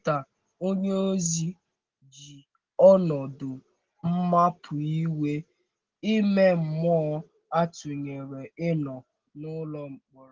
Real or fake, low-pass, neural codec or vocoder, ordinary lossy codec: real; 7.2 kHz; none; Opus, 16 kbps